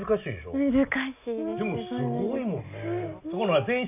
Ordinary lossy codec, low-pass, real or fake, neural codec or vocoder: none; 3.6 kHz; real; none